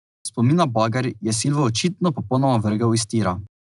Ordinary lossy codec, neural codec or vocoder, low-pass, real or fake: none; none; 10.8 kHz; real